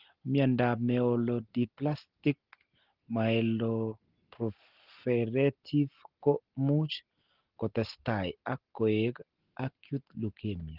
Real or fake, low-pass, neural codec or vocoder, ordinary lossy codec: real; 5.4 kHz; none; Opus, 16 kbps